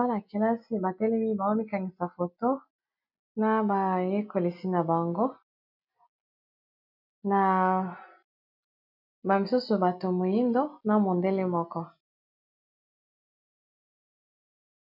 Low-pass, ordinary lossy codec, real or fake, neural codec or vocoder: 5.4 kHz; MP3, 48 kbps; real; none